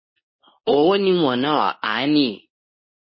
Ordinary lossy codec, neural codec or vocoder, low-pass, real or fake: MP3, 24 kbps; codec, 24 kHz, 0.9 kbps, WavTokenizer, medium speech release version 2; 7.2 kHz; fake